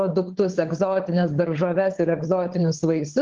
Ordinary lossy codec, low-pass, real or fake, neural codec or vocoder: Opus, 16 kbps; 7.2 kHz; fake; codec, 16 kHz, 16 kbps, FunCodec, trained on LibriTTS, 50 frames a second